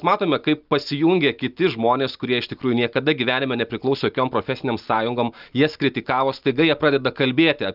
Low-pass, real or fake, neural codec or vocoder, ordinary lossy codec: 5.4 kHz; real; none; Opus, 24 kbps